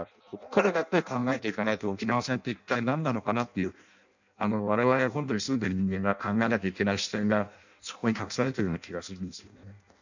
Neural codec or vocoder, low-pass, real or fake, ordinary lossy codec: codec, 16 kHz in and 24 kHz out, 0.6 kbps, FireRedTTS-2 codec; 7.2 kHz; fake; none